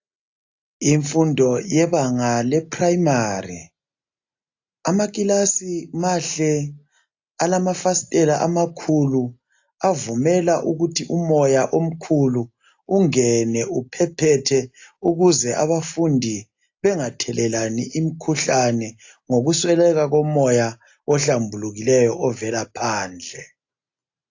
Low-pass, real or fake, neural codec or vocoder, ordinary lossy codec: 7.2 kHz; real; none; AAC, 48 kbps